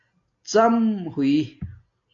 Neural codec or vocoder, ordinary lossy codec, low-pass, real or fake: none; AAC, 48 kbps; 7.2 kHz; real